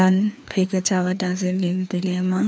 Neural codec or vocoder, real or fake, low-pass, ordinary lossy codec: codec, 16 kHz, 2 kbps, FreqCodec, larger model; fake; none; none